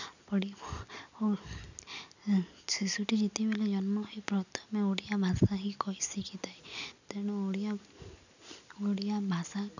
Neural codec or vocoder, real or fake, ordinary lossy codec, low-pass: none; real; none; 7.2 kHz